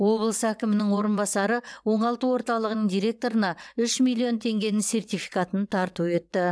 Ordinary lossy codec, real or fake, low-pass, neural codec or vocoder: none; fake; none; vocoder, 22.05 kHz, 80 mel bands, WaveNeXt